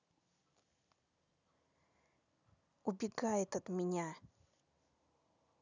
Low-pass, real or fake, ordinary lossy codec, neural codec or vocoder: 7.2 kHz; real; none; none